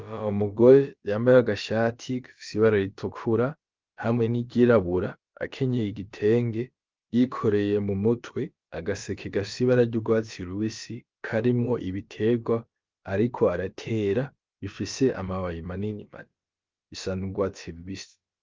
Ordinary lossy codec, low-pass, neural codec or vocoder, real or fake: Opus, 24 kbps; 7.2 kHz; codec, 16 kHz, about 1 kbps, DyCAST, with the encoder's durations; fake